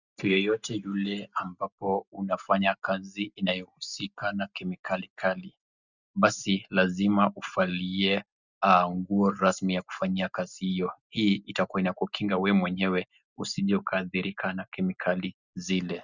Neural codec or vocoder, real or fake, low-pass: none; real; 7.2 kHz